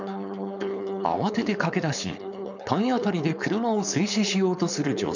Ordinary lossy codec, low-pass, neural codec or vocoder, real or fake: none; 7.2 kHz; codec, 16 kHz, 4.8 kbps, FACodec; fake